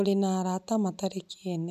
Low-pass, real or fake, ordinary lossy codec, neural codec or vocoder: 14.4 kHz; real; none; none